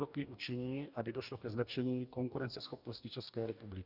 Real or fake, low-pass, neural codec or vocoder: fake; 5.4 kHz; codec, 44.1 kHz, 2.6 kbps, DAC